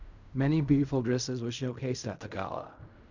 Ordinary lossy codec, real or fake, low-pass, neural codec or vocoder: none; fake; 7.2 kHz; codec, 16 kHz in and 24 kHz out, 0.4 kbps, LongCat-Audio-Codec, fine tuned four codebook decoder